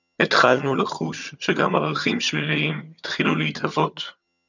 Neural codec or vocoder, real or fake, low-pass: vocoder, 22.05 kHz, 80 mel bands, HiFi-GAN; fake; 7.2 kHz